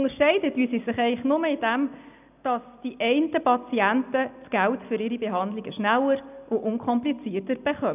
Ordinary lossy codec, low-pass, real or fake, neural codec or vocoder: none; 3.6 kHz; real; none